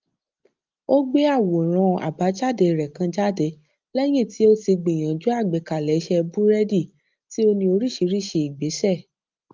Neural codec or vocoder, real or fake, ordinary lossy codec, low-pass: none; real; Opus, 24 kbps; 7.2 kHz